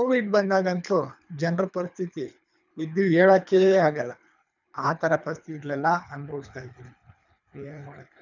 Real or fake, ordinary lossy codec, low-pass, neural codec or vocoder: fake; none; 7.2 kHz; codec, 24 kHz, 3 kbps, HILCodec